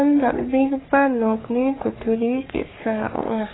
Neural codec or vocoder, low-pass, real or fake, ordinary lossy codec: codec, 44.1 kHz, 3.4 kbps, Pupu-Codec; 7.2 kHz; fake; AAC, 16 kbps